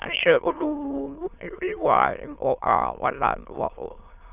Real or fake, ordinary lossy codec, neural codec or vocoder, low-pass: fake; none; autoencoder, 22.05 kHz, a latent of 192 numbers a frame, VITS, trained on many speakers; 3.6 kHz